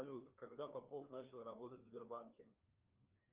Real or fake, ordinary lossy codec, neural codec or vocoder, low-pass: fake; Opus, 24 kbps; codec, 16 kHz, 2 kbps, FreqCodec, larger model; 3.6 kHz